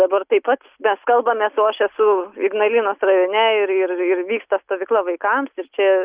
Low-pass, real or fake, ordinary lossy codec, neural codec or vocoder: 3.6 kHz; real; Opus, 64 kbps; none